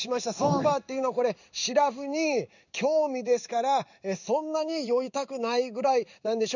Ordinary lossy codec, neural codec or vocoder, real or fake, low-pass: none; codec, 16 kHz in and 24 kHz out, 1 kbps, XY-Tokenizer; fake; 7.2 kHz